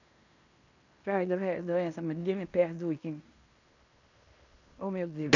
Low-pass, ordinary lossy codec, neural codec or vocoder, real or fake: 7.2 kHz; none; codec, 16 kHz in and 24 kHz out, 0.9 kbps, LongCat-Audio-Codec, fine tuned four codebook decoder; fake